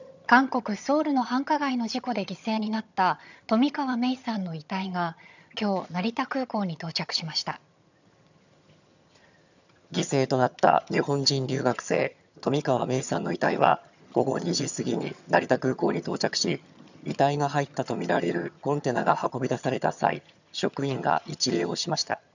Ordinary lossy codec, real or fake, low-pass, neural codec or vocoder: none; fake; 7.2 kHz; vocoder, 22.05 kHz, 80 mel bands, HiFi-GAN